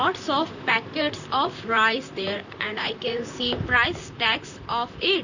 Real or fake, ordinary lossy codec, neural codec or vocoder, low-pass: fake; none; vocoder, 44.1 kHz, 128 mel bands, Pupu-Vocoder; 7.2 kHz